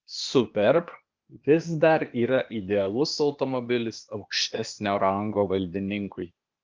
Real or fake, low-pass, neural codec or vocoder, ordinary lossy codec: fake; 7.2 kHz; codec, 16 kHz, 0.8 kbps, ZipCodec; Opus, 24 kbps